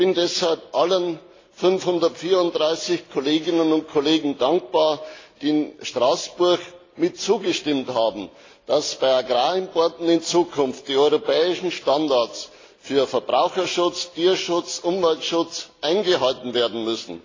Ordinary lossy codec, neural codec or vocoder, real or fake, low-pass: AAC, 32 kbps; none; real; 7.2 kHz